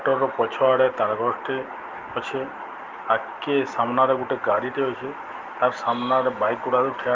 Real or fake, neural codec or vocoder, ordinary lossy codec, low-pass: real; none; Opus, 32 kbps; 7.2 kHz